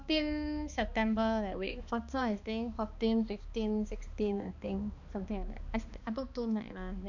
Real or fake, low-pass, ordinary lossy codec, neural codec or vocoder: fake; 7.2 kHz; none; codec, 16 kHz, 2 kbps, X-Codec, HuBERT features, trained on balanced general audio